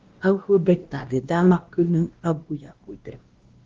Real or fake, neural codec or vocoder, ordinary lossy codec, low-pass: fake; codec, 16 kHz, 1 kbps, X-Codec, WavLM features, trained on Multilingual LibriSpeech; Opus, 16 kbps; 7.2 kHz